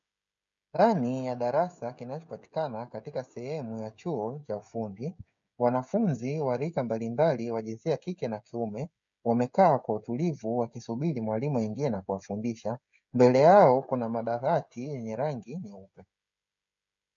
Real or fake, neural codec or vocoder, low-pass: fake; codec, 16 kHz, 16 kbps, FreqCodec, smaller model; 7.2 kHz